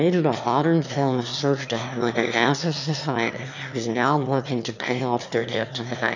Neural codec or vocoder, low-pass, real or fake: autoencoder, 22.05 kHz, a latent of 192 numbers a frame, VITS, trained on one speaker; 7.2 kHz; fake